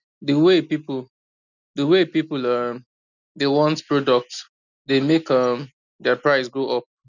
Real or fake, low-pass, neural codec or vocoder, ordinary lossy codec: real; 7.2 kHz; none; none